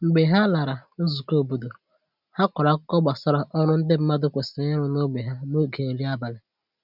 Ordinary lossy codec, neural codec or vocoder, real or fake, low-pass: none; none; real; 5.4 kHz